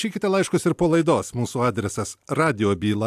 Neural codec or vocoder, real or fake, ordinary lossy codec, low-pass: none; real; AAC, 96 kbps; 14.4 kHz